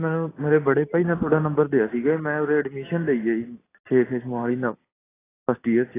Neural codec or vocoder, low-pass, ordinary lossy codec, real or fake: none; 3.6 kHz; AAC, 16 kbps; real